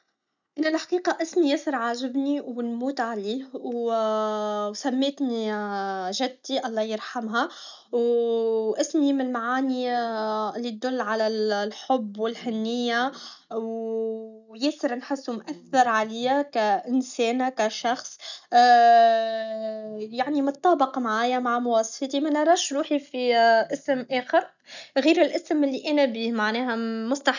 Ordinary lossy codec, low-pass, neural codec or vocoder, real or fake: none; 7.2 kHz; none; real